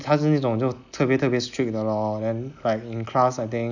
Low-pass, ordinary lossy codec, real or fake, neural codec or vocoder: 7.2 kHz; none; real; none